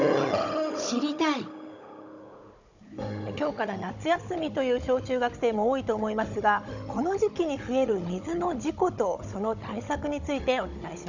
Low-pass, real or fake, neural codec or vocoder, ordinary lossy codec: 7.2 kHz; fake; codec, 16 kHz, 16 kbps, FunCodec, trained on Chinese and English, 50 frames a second; none